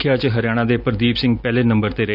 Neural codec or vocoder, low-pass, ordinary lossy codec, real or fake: none; 5.4 kHz; none; real